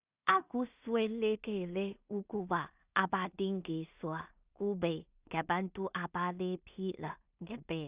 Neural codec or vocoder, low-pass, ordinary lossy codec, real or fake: codec, 16 kHz in and 24 kHz out, 0.4 kbps, LongCat-Audio-Codec, two codebook decoder; 3.6 kHz; Opus, 64 kbps; fake